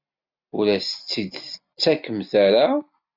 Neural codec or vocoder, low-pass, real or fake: none; 5.4 kHz; real